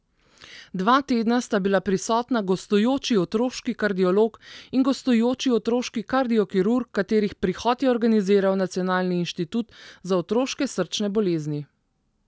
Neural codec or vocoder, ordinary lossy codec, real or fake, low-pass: none; none; real; none